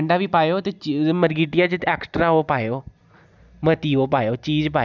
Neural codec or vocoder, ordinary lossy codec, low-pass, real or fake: none; none; 7.2 kHz; real